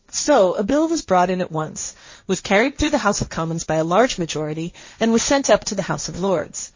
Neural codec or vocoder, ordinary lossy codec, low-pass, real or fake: codec, 16 kHz, 1.1 kbps, Voila-Tokenizer; MP3, 32 kbps; 7.2 kHz; fake